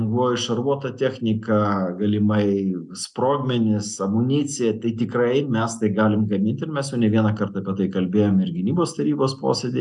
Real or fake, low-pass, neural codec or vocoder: real; 10.8 kHz; none